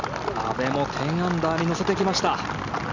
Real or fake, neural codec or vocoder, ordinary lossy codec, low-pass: real; none; none; 7.2 kHz